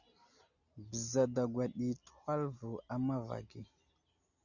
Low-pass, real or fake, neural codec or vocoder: 7.2 kHz; real; none